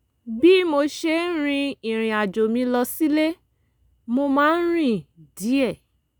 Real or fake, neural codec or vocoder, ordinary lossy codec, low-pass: real; none; none; none